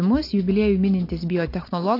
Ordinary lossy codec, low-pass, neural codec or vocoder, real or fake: AAC, 32 kbps; 5.4 kHz; none; real